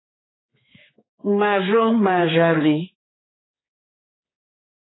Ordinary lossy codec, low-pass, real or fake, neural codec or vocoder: AAC, 16 kbps; 7.2 kHz; fake; codec, 16 kHz, 4 kbps, X-Codec, HuBERT features, trained on general audio